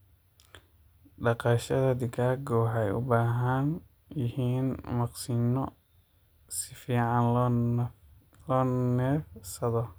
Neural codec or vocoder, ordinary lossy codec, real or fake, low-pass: none; none; real; none